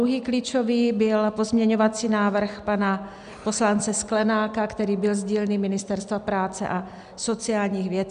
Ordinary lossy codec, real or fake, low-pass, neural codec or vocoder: MP3, 96 kbps; real; 9.9 kHz; none